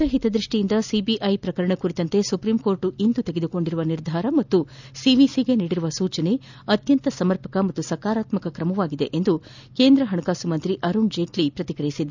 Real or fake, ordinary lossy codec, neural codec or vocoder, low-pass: real; none; none; 7.2 kHz